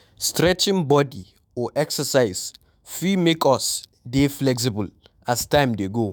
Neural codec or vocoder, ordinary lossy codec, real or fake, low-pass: autoencoder, 48 kHz, 128 numbers a frame, DAC-VAE, trained on Japanese speech; none; fake; none